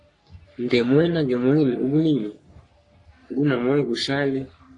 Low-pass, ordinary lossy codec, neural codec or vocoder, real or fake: 10.8 kHz; AAC, 48 kbps; codec, 44.1 kHz, 3.4 kbps, Pupu-Codec; fake